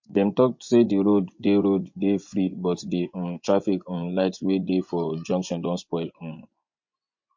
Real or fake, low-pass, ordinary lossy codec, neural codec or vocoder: fake; 7.2 kHz; MP3, 48 kbps; vocoder, 24 kHz, 100 mel bands, Vocos